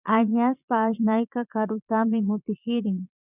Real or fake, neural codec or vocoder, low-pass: fake; vocoder, 22.05 kHz, 80 mel bands, Vocos; 3.6 kHz